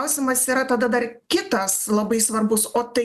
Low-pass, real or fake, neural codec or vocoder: 14.4 kHz; real; none